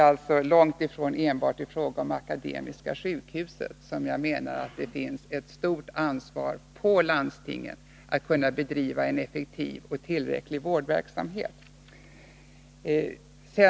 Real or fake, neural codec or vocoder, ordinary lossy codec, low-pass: real; none; none; none